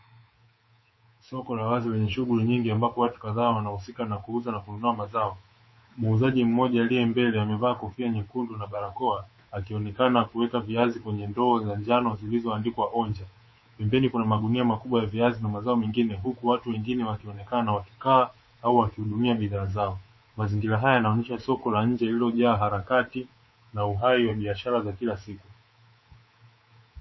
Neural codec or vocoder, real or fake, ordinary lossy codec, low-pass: codec, 24 kHz, 3.1 kbps, DualCodec; fake; MP3, 24 kbps; 7.2 kHz